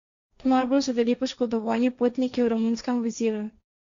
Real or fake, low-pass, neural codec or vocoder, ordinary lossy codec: fake; 7.2 kHz; codec, 16 kHz, 1.1 kbps, Voila-Tokenizer; Opus, 64 kbps